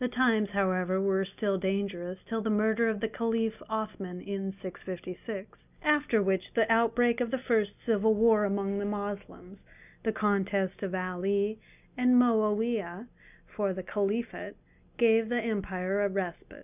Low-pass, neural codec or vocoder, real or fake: 3.6 kHz; none; real